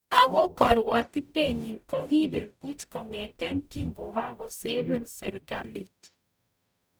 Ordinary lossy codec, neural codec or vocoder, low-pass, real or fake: none; codec, 44.1 kHz, 0.9 kbps, DAC; none; fake